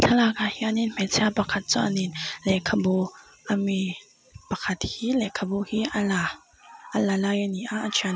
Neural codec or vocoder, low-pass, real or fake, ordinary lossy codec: none; none; real; none